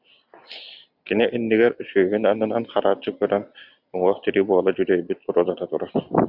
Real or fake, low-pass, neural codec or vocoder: real; 5.4 kHz; none